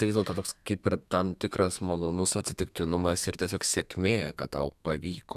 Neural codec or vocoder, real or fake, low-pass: codec, 32 kHz, 1.9 kbps, SNAC; fake; 14.4 kHz